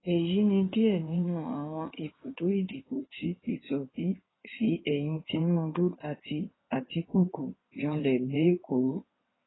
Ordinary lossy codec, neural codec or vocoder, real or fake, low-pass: AAC, 16 kbps; codec, 16 kHz in and 24 kHz out, 2.2 kbps, FireRedTTS-2 codec; fake; 7.2 kHz